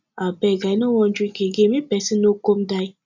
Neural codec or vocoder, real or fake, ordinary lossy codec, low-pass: none; real; none; 7.2 kHz